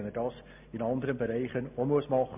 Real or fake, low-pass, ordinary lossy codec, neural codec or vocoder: real; 3.6 kHz; MP3, 32 kbps; none